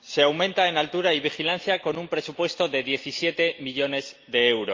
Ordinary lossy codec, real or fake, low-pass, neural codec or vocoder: Opus, 24 kbps; real; 7.2 kHz; none